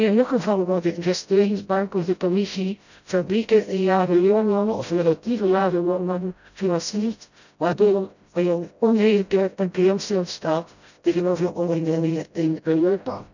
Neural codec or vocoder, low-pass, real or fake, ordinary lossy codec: codec, 16 kHz, 0.5 kbps, FreqCodec, smaller model; 7.2 kHz; fake; none